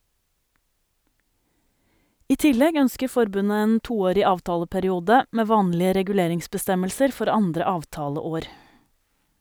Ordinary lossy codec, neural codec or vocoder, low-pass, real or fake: none; none; none; real